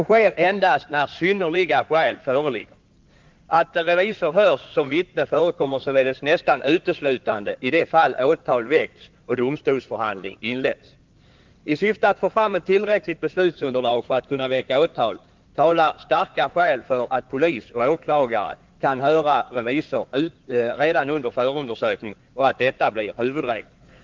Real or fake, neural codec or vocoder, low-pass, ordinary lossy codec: fake; codec, 16 kHz in and 24 kHz out, 2.2 kbps, FireRedTTS-2 codec; 7.2 kHz; Opus, 24 kbps